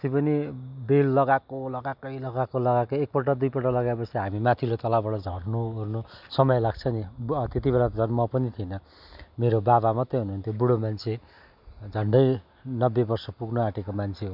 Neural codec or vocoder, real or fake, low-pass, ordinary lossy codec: none; real; 5.4 kHz; none